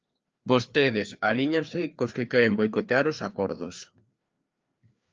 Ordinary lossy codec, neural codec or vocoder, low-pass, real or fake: Opus, 24 kbps; codec, 16 kHz, 2 kbps, FreqCodec, larger model; 7.2 kHz; fake